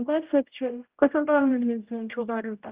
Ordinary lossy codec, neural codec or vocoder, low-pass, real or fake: Opus, 32 kbps; codec, 16 kHz, 0.5 kbps, X-Codec, HuBERT features, trained on general audio; 3.6 kHz; fake